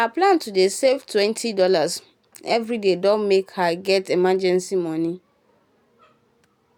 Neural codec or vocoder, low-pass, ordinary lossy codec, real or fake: none; 19.8 kHz; none; real